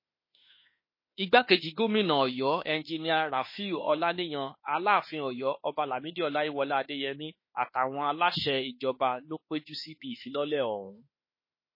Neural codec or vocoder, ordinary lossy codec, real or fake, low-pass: autoencoder, 48 kHz, 32 numbers a frame, DAC-VAE, trained on Japanese speech; MP3, 24 kbps; fake; 5.4 kHz